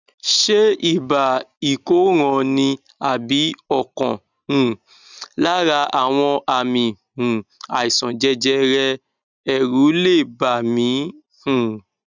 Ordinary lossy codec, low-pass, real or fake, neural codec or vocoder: none; 7.2 kHz; real; none